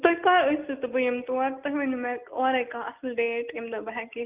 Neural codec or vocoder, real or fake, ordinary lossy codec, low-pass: none; real; Opus, 32 kbps; 3.6 kHz